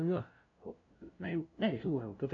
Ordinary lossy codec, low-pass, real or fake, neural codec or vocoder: none; 7.2 kHz; fake; codec, 16 kHz, 0.5 kbps, FunCodec, trained on LibriTTS, 25 frames a second